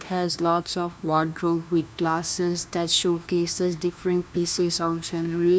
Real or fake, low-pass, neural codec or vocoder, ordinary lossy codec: fake; none; codec, 16 kHz, 1 kbps, FunCodec, trained on LibriTTS, 50 frames a second; none